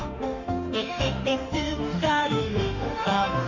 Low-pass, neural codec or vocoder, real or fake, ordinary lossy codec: 7.2 kHz; autoencoder, 48 kHz, 32 numbers a frame, DAC-VAE, trained on Japanese speech; fake; none